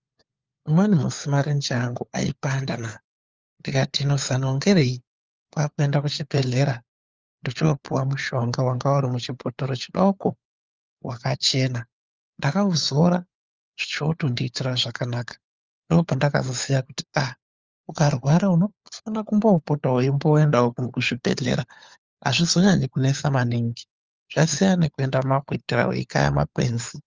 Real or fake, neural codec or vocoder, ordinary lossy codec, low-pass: fake; codec, 16 kHz, 4 kbps, FunCodec, trained on LibriTTS, 50 frames a second; Opus, 32 kbps; 7.2 kHz